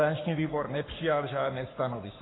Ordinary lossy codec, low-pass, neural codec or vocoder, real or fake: AAC, 16 kbps; 7.2 kHz; codec, 44.1 kHz, 7.8 kbps, Pupu-Codec; fake